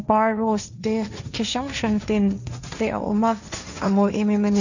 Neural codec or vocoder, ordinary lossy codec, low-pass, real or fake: codec, 16 kHz, 1.1 kbps, Voila-Tokenizer; none; 7.2 kHz; fake